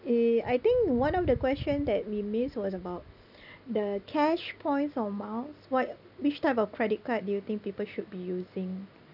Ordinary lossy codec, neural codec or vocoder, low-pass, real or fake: none; none; 5.4 kHz; real